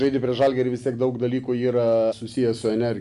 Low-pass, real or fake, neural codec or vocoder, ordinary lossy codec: 10.8 kHz; real; none; AAC, 96 kbps